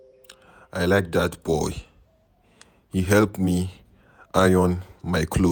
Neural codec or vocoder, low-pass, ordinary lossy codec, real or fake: vocoder, 48 kHz, 128 mel bands, Vocos; none; none; fake